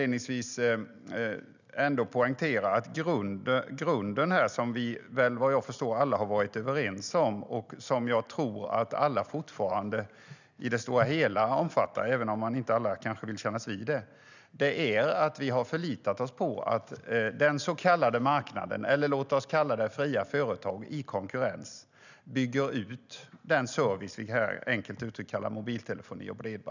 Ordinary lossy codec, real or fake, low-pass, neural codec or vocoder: none; real; 7.2 kHz; none